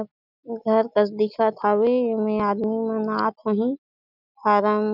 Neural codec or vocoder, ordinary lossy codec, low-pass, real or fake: none; none; 5.4 kHz; real